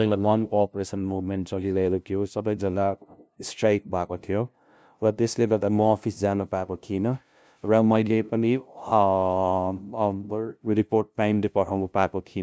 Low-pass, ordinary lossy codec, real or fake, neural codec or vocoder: none; none; fake; codec, 16 kHz, 0.5 kbps, FunCodec, trained on LibriTTS, 25 frames a second